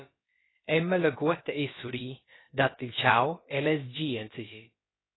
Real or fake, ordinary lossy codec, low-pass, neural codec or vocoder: fake; AAC, 16 kbps; 7.2 kHz; codec, 16 kHz, about 1 kbps, DyCAST, with the encoder's durations